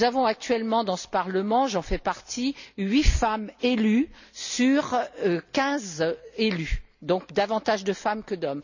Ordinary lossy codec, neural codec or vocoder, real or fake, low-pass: none; none; real; 7.2 kHz